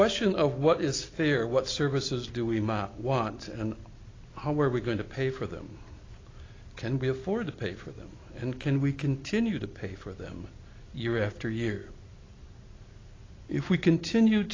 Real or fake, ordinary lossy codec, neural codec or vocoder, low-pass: real; AAC, 32 kbps; none; 7.2 kHz